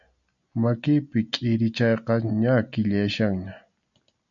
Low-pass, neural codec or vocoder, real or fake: 7.2 kHz; none; real